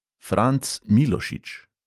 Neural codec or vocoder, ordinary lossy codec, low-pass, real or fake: none; Opus, 32 kbps; 14.4 kHz; real